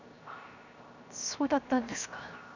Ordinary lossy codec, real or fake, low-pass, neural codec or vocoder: none; fake; 7.2 kHz; codec, 16 kHz, 0.7 kbps, FocalCodec